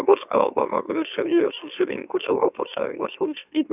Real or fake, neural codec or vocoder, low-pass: fake; autoencoder, 44.1 kHz, a latent of 192 numbers a frame, MeloTTS; 3.6 kHz